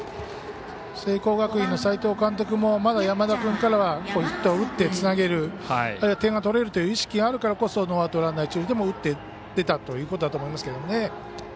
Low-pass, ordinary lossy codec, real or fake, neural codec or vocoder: none; none; real; none